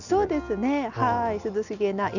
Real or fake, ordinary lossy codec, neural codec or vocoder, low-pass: real; none; none; 7.2 kHz